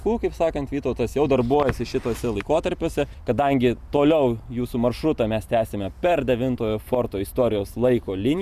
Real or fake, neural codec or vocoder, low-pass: real; none; 14.4 kHz